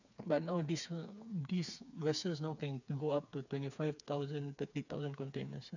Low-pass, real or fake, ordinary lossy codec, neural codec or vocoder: 7.2 kHz; fake; none; codec, 16 kHz, 4 kbps, FreqCodec, smaller model